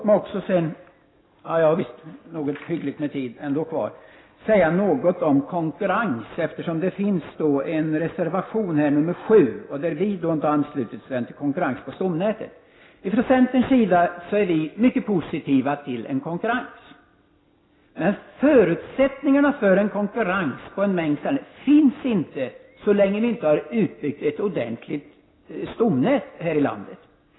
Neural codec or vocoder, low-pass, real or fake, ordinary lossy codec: none; 7.2 kHz; real; AAC, 16 kbps